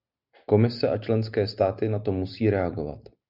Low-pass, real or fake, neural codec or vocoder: 5.4 kHz; real; none